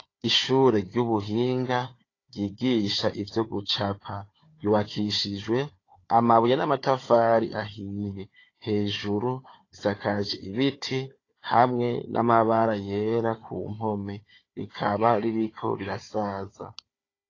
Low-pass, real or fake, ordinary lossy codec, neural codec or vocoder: 7.2 kHz; fake; AAC, 32 kbps; codec, 16 kHz, 4 kbps, FunCodec, trained on Chinese and English, 50 frames a second